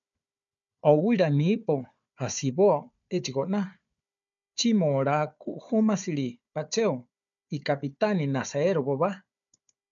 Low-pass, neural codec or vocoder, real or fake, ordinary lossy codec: 7.2 kHz; codec, 16 kHz, 4 kbps, FunCodec, trained on Chinese and English, 50 frames a second; fake; MP3, 96 kbps